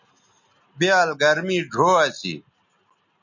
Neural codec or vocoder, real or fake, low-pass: none; real; 7.2 kHz